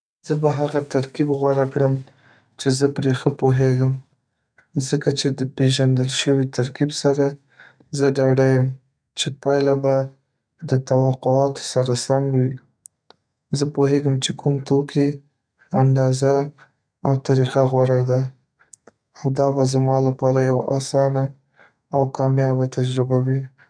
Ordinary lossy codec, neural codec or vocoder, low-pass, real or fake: none; codec, 32 kHz, 1.9 kbps, SNAC; 9.9 kHz; fake